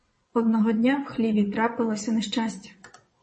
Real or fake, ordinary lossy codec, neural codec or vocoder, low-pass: fake; MP3, 32 kbps; vocoder, 22.05 kHz, 80 mel bands, WaveNeXt; 9.9 kHz